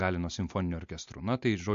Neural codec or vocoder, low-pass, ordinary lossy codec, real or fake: none; 7.2 kHz; MP3, 48 kbps; real